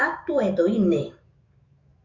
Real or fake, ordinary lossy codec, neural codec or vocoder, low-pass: fake; Opus, 64 kbps; autoencoder, 48 kHz, 128 numbers a frame, DAC-VAE, trained on Japanese speech; 7.2 kHz